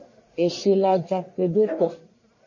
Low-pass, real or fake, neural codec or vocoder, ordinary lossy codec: 7.2 kHz; fake; codec, 44.1 kHz, 1.7 kbps, Pupu-Codec; MP3, 32 kbps